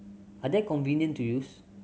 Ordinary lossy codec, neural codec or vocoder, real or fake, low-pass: none; none; real; none